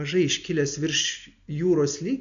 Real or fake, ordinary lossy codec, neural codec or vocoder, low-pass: real; MP3, 96 kbps; none; 7.2 kHz